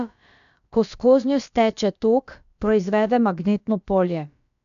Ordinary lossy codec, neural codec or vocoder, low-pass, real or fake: none; codec, 16 kHz, about 1 kbps, DyCAST, with the encoder's durations; 7.2 kHz; fake